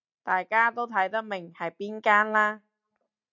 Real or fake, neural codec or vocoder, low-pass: real; none; 7.2 kHz